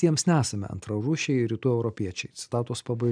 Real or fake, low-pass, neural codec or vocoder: real; 9.9 kHz; none